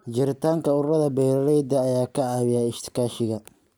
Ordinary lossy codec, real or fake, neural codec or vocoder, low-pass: none; real; none; none